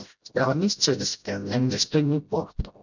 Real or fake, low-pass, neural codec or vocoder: fake; 7.2 kHz; codec, 16 kHz, 0.5 kbps, FreqCodec, smaller model